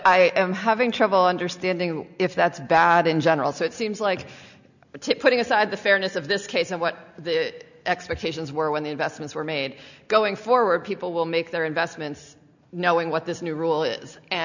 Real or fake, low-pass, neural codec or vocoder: real; 7.2 kHz; none